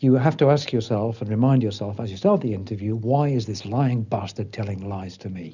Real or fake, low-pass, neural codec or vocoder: real; 7.2 kHz; none